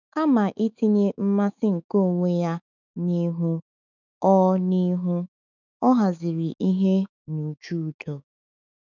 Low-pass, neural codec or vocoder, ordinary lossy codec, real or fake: 7.2 kHz; autoencoder, 48 kHz, 128 numbers a frame, DAC-VAE, trained on Japanese speech; none; fake